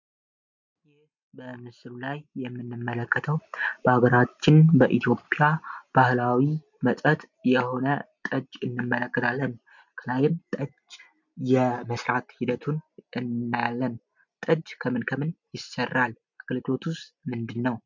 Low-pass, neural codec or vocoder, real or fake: 7.2 kHz; none; real